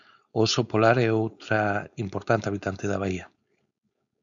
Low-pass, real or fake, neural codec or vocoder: 7.2 kHz; fake; codec, 16 kHz, 4.8 kbps, FACodec